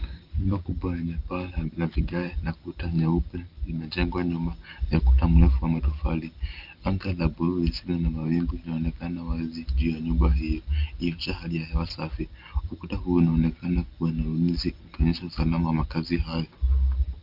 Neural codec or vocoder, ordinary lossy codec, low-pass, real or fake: none; Opus, 24 kbps; 5.4 kHz; real